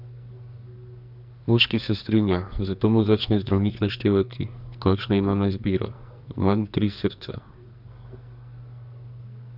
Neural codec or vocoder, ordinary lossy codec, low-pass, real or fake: codec, 44.1 kHz, 2.6 kbps, SNAC; none; 5.4 kHz; fake